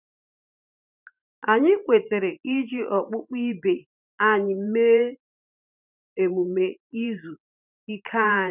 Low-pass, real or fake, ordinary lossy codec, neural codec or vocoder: 3.6 kHz; fake; none; vocoder, 44.1 kHz, 128 mel bands every 512 samples, BigVGAN v2